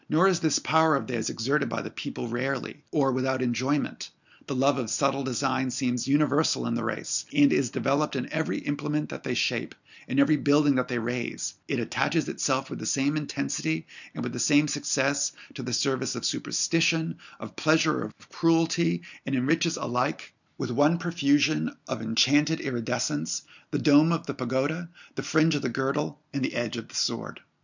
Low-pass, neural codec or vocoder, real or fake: 7.2 kHz; none; real